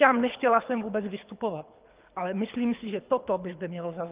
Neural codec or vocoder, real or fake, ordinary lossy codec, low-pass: codec, 24 kHz, 6 kbps, HILCodec; fake; Opus, 24 kbps; 3.6 kHz